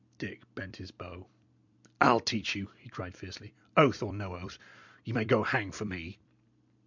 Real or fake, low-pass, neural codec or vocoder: fake; 7.2 kHz; vocoder, 44.1 kHz, 128 mel bands every 256 samples, BigVGAN v2